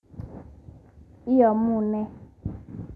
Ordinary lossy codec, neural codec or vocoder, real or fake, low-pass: none; none; real; none